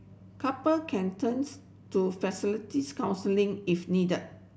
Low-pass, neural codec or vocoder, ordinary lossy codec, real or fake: none; none; none; real